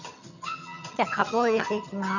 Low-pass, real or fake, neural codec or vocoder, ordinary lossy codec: 7.2 kHz; fake; vocoder, 22.05 kHz, 80 mel bands, HiFi-GAN; none